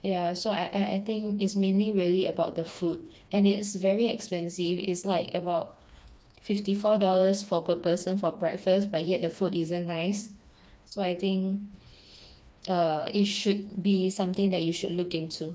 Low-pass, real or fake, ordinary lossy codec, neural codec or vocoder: none; fake; none; codec, 16 kHz, 2 kbps, FreqCodec, smaller model